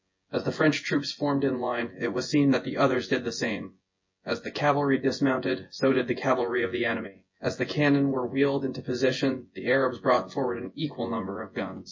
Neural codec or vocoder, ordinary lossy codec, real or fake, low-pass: vocoder, 24 kHz, 100 mel bands, Vocos; MP3, 32 kbps; fake; 7.2 kHz